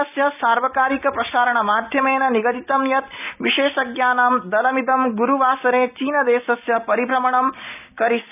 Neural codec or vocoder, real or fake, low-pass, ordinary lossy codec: none; real; 3.6 kHz; none